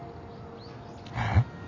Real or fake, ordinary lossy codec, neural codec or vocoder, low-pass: real; none; none; 7.2 kHz